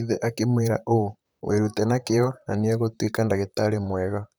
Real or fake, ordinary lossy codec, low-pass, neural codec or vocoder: fake; none; none; vocoder, 44.1 kHz, 128 mel bands, Pupu-Vocoder